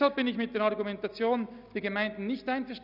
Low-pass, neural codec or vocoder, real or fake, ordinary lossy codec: 5.4 kHz; none; real; none